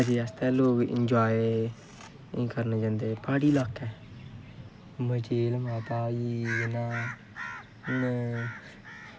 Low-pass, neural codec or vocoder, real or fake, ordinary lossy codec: none; none; real; none